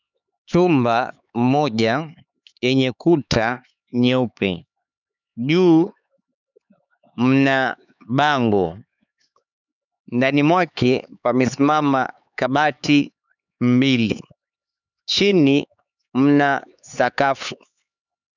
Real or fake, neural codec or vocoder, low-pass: fake; codec, 16 kHz, 4 kbps, X-Codec, HuBERT features, trained on LibriSpeech; 7.2 kHz